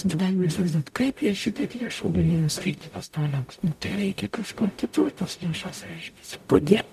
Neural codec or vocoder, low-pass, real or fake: codec, 44.1 kHz, 0.9 kbps, DAC; 14.4 kHz; fake